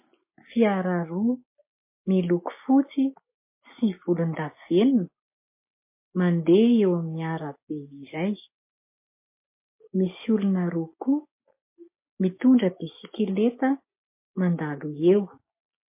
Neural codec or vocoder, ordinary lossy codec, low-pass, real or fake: none; MP3, 24 kbps; 3.6 kHz; real